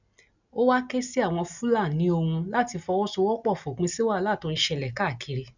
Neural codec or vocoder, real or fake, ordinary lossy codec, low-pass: none; real; none; 7.2 kHz